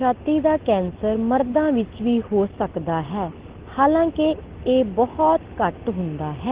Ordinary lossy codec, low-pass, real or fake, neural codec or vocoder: Opus, 16 kbps; 3.6 kHz; real; none